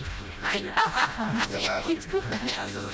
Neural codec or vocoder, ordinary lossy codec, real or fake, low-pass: codec, 16 kHz, 0.5 kbps, FreqCodec, smaller model; none; fake; none